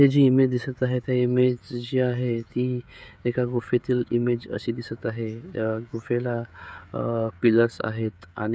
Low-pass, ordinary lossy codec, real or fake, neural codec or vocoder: none; none; fake; codec, 16 kHz, 16 kbps, FreqCodec, smaller model